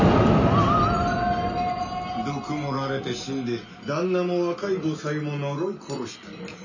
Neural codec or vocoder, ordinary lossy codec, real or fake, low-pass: none; none; real; 7.2 kHz